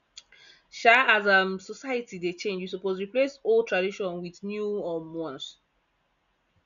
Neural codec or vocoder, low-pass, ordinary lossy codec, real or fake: none; 7.2 kHz; none; real